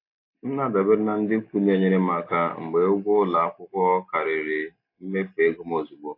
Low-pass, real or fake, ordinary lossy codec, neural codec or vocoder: 5.4 kHz; real; AAC, 32 kbps; none